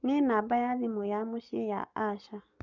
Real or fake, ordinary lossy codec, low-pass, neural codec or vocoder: fake; none; 7.2 kHz; codec, 44.1 kHz, 7.8 kbps, Pupu-Codec